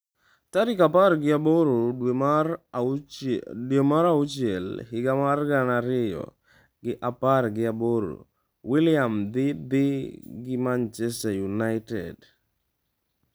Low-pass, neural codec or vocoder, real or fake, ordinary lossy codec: none; none; real; none